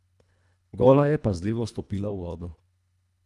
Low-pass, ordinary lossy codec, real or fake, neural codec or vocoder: none; none; fake; codec, 24 kHz, 1.5 kbps, HILCodec